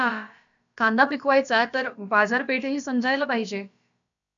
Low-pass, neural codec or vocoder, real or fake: 7.2 kHz; codec, 16 kHz, about 1 kbps, DyCAST, with the encoder's durations; fake